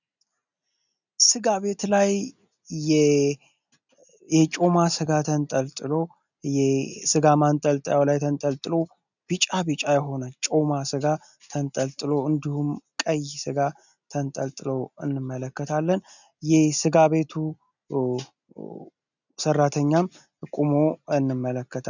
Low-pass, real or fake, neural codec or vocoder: 7.2 kHz; real; none